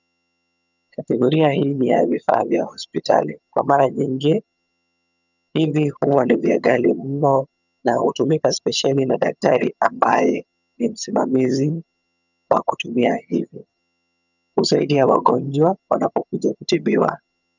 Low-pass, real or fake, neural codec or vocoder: 7.2 kHz; fake; vocoder, 22.05 kHz, 80 mel bands, HiFi-GAN